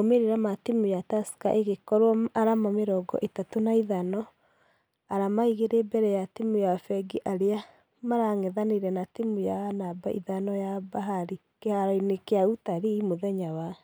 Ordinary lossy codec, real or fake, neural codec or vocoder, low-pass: none; real; none; none